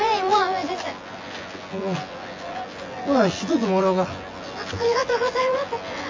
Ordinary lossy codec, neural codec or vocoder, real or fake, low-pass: MP3, 48 kbps; vocoder, 24 kHz, 100 mel bands, Vocos; fake; 7.2 kHz